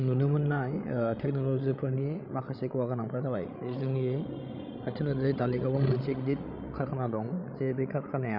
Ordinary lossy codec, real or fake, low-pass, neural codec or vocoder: none; fake; 5.4 kHz; codec, 16 kHz, 16 kbps, FreqCodec, larger model